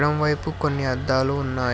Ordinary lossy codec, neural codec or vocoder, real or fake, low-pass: none; none; real; none